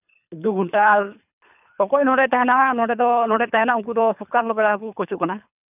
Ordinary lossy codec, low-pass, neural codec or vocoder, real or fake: none; 3.6 kHz; codec, 24 kHz, 3 kbps, HILCodec; fake